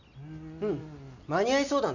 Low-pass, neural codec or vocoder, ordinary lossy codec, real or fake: 7.2 kHz; none; none; real